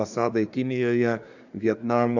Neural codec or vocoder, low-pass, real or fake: codec, 24 kHz, 1 kbps, SNAC; 7.2 kHz; fake